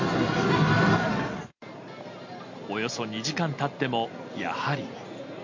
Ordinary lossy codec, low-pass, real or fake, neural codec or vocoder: MP3, 64 kbps; 7.2 kHz; real; none